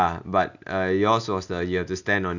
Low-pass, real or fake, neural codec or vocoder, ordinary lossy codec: 7.2 kHz; real; none; none